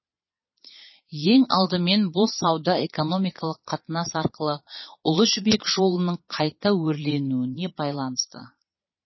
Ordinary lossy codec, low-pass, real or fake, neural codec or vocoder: MP3, 24 kbps; 7.2 kHz; fake; vocoder, 22.05 kHz, 80 mel bands, Vocos